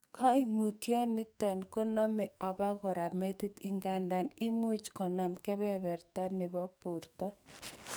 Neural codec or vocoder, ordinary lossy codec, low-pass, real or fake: codec, 44.1 kHz, 2.6 kbps, SNAC; none; none; fake